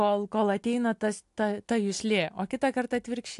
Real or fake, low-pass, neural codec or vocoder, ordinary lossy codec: real; 10.8 kHz; none; AAC, 64 kbps